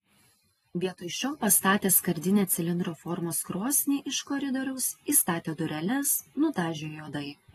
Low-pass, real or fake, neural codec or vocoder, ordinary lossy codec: 19.8 kHz; real; none; AAC, 32 kbps